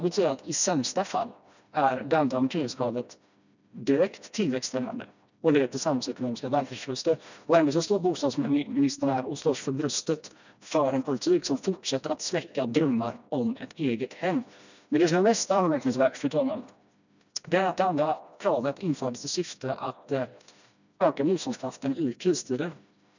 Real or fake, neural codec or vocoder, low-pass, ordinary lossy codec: fake; codec, 16 kHz, 1 kbps, FreqCodec, smaller model; 7.2 kHz; none